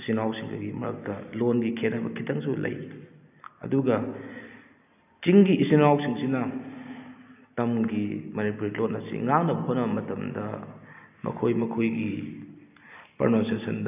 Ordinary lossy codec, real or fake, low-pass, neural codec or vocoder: none; real; 3.6 kHz; none